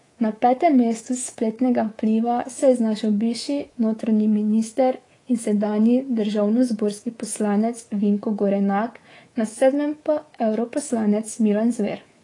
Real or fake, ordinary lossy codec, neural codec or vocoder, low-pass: fake; AAC, 32 kbps; codec, 24 kHz, 3.1 kbps, DualCodec; 10.8 kHz